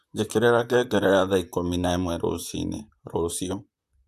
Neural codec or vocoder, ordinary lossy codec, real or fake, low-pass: vocoder, 44.1 kHz, 128 mel bands, Pupu-Vocoder; Opus, 64 kbps; fake; 14.4 kHz